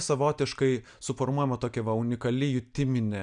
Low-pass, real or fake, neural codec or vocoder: 9.9 kHz; real; none